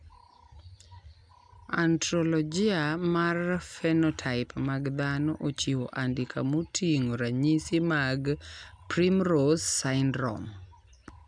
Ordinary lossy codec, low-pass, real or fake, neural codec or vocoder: none; 9.9 kHz; real; none